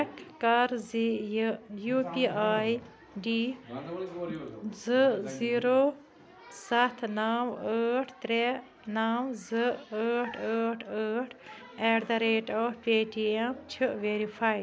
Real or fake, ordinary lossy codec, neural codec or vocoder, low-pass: real; none; none; none